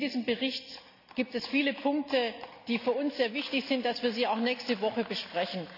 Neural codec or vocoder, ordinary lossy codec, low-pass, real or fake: none; none; 5.4 kHz; real